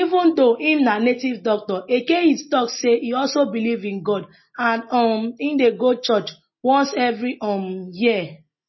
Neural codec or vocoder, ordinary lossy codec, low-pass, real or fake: none; MP3, 24 kbps; 7.2 kHz; real